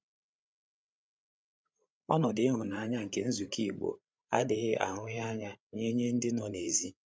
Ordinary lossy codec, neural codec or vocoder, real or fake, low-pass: none; codec, 16 kHz, 8 kbps, FreqCodec, larger model; fake; none